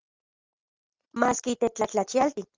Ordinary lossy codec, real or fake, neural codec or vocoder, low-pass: Opus, 24 kbps; real; none; 7.2 kHz